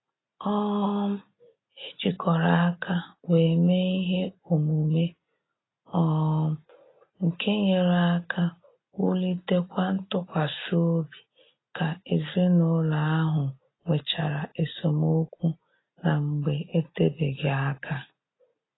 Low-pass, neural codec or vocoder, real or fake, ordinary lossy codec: 7.2 kHz; none; real; AAC, 16 kbps